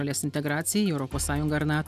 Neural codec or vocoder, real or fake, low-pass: none; real; 14.4 kHz